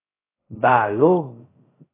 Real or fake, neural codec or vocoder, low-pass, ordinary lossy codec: fake; codec, 16 kHz, 0.2 kbps, FocalCodec; 3.6 kHz; AAC, 16 kbps